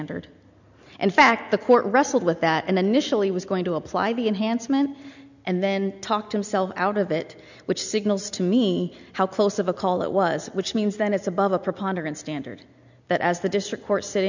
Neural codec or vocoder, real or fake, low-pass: none; real; 7.2 kHz